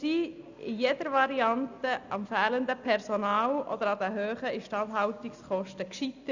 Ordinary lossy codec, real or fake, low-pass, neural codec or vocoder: Opus, 64 kbps; real; 7.2 kHz; none